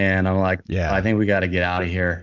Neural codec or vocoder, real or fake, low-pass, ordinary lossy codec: none; real; 7.2 kHz; MP3, 64 kbps